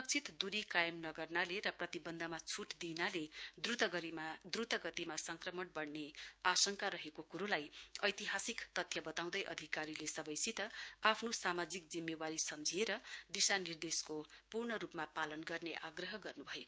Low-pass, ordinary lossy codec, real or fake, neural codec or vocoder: none; none; fake; codec, 16 kHz, 6 kbps, DAC